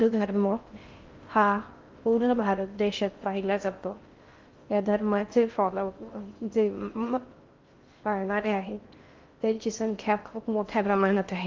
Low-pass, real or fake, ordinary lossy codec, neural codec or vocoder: 7.2 kHz; fake; Opus, 32 kbps; codec, 16 kHz in and 24 kHz out, 0.6 kbps, FocalCodec, streaming, 4096 codes